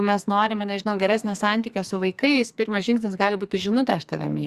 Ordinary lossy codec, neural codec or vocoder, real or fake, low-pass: Opus, 64 kbps; codec, 44.1 kHz, 2.6 kbps, SNAC; fake; 14.4 kHz